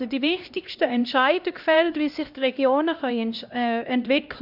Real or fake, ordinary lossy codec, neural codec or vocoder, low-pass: fake; none; codec, 16 kHz, 1 kbps, X-Codec, HuBERT features, trained on LibriSpeech; 5.4 kHz